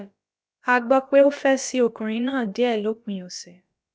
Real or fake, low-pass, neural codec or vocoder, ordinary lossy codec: fake; none; codec, 16 kHz, about 1 kbps, DyCAST, with the encoder's durations; none